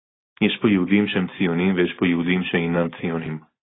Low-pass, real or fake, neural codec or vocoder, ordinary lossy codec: 7.2 kHz; real; none; AAC, 16 kbps